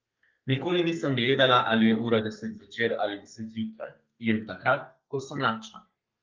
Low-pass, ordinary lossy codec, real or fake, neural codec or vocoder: 7.2 kHz; Opus, 24 kbps; fake; codec, 32 kHz, 1.9 kbps, SNAC